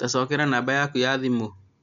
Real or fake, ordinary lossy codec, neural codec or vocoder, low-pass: real; none; none; 7.2 kHz